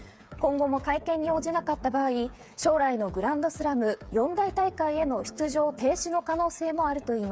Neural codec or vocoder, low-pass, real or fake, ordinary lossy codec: codec, 16 kHz, 8 kbps, FreqCodec, smaller model; none; fake; none